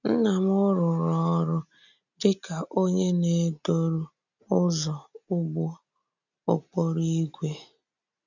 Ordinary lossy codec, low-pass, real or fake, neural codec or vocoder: none; 7.2 kHz; real; none